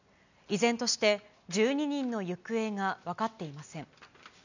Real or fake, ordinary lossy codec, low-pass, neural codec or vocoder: real; none; 7.2 kHz; none